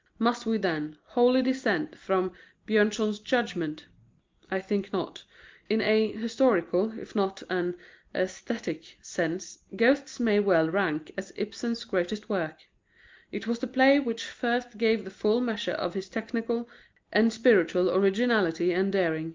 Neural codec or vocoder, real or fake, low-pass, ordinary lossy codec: none; real; 7.2 kHz; Opus, 32 kbps